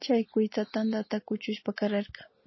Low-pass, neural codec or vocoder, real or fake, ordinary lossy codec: 7.2 kHz; none; real; MP3, 24 kbps